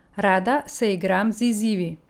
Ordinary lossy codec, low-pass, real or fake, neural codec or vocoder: Opus, 24 kbps; 19.8 kHz; real; none